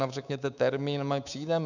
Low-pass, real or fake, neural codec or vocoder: 7.2 kHz; fake; codec, 24 kHz, 3.1 kbps, DualCodec